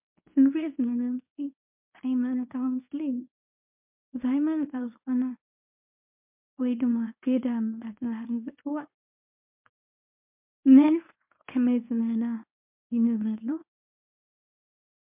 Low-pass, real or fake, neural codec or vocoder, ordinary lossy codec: 3.6 kHz; fake; codec, 24 kHz, 0.9 kbps, WavTokenizer, medium speech release version 1; MP3, 32 kbps